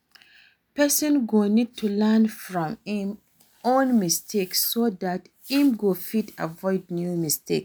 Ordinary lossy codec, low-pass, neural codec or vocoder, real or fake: none; none; none; real